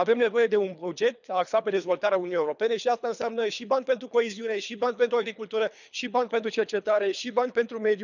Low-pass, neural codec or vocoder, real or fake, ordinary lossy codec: 7.2 kHz; codec, 24 kHz, 3 kbps, HILCodec; fake; none